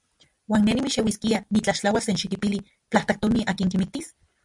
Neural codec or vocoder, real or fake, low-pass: none; real; 10.8 kHz